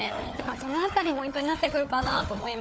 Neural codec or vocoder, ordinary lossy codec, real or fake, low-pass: codec, 16 kHz, 4 kbps, FunCodec, trained on Chinese and English, 50 frames a second; none; fake; none